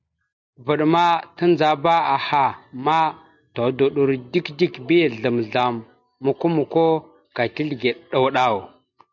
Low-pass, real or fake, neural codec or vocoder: 7.2 kHz; real; none